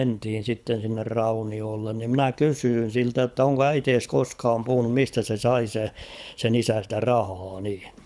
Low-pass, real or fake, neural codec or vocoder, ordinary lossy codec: none; fake; codec, 24 kHz, 6 kbps, HILCodec; none